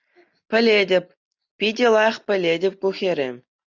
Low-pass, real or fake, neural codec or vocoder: 7.2 kHz; real; none